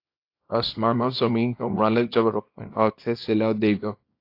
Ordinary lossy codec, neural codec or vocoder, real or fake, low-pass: AAC, 32 kbps; codec, 24 kHz, 0.9 kbps, WavTokenizer, small release; fake; 5.4 kHz